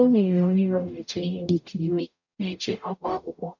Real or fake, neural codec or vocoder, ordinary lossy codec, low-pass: fake; codec, 44.1 kHz, 0.9 kbps, DAC; none; 7.2 kHz